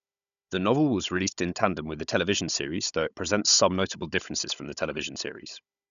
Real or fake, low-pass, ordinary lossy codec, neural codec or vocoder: fake; 7.2 kHz; none; codec, 16 kHz, 16 kbps, FunCodec, trained on Chinese and English, 50 frames a second